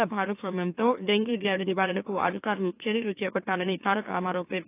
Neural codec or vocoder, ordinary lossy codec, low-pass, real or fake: autoencoder, 44.1 kHz, a latent of 192 numbers a frame, MeloTTS; AAC, 24 kbps; 3.6 kHz; fake